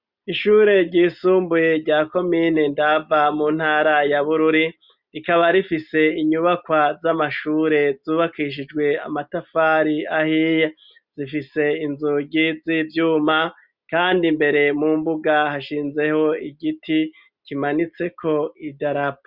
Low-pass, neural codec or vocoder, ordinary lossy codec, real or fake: 5.4 kHz; none; Opus, 64 kbps; real